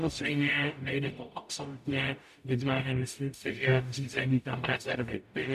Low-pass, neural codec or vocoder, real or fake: 14.4 kHz; codec, 44.1 kHz, 0.9 kbps, DAC; fake